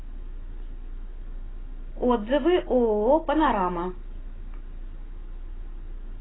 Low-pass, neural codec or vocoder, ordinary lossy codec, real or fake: 7.2 kHz; none; AAC, 16 kbps; real